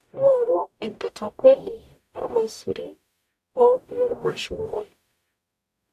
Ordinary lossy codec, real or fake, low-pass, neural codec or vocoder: none; fake; 14.4 kHz; codec, 44.1 kHz, 0.9 kbps, DAC